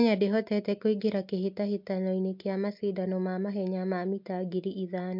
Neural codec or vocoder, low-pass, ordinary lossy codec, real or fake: none; 5.4 kHz; MP3, 48 kbps; real